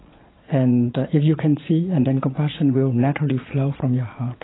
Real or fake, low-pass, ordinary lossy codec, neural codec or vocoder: fake; 7.2 kHz; AAC, 16 kbps; vocoder, 22.05 kHz, 80 mel bands, Vocos